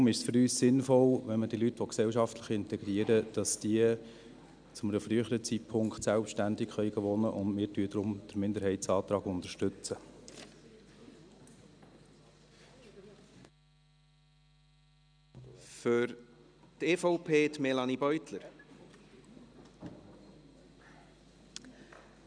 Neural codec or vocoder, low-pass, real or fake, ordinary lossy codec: none; 9.9 kHz; real; none